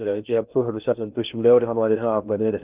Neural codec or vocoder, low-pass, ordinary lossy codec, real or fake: codec, 16 kHz in and 24 kHz out, 0.6 kbps, FocalCodec, streaming, 2048 codes; 3.6 kHz; Opus, 24 kbps; fake